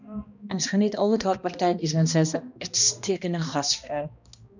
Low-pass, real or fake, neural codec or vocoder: 7.2 kHz; fake; codec, 16 kHz, 1 kbps, X-Codec, HuBERT features, trained on balanced general audio